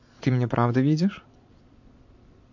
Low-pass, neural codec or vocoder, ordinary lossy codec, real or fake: 7.2 kHz; vocoder, 22.05 kHz, 80 mel bands, Vocos; MP3, 48 kbps; fake